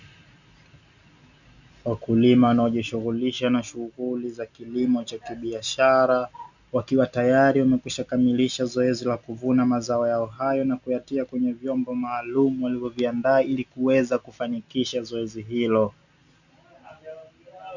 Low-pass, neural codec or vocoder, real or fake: 7.2 kHz; none; real